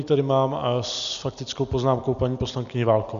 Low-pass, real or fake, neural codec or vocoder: 7.2 kHz; real; none